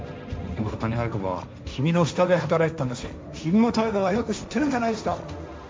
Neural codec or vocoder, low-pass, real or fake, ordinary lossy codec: codec, 16 kHz, 1.1 kbps, Voila-Tokenizer; none; fake; none